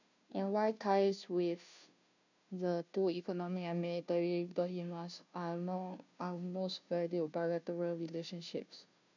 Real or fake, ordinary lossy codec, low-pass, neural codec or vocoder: fake; none; 7.2 kHz; codec, 16 kHz, 0.5 kbps, FunCodec, trained on Chinese and English, 25 frames a second